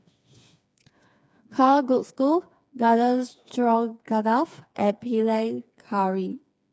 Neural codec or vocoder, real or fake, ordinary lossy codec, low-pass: codec, 16 kHz, 4 kbps, FreqCodec, smaller model; fake; none; none